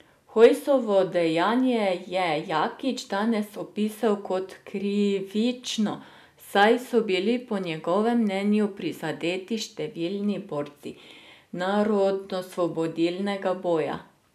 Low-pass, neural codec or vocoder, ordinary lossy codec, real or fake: 14.4 kHz; none; none; real